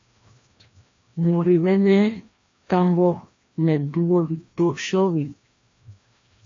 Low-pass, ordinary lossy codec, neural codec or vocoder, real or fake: 7.2 kHz; AAC, 48 kbps; codec, 16 kHz, 1 kbps, FreqCodec, larger model; fake